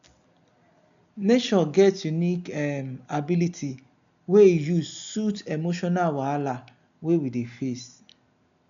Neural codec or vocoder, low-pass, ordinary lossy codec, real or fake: none; 7.2 kHz; none; real